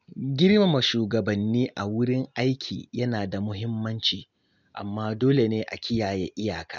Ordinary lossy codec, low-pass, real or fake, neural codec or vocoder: none; 7.2 kHz; real; none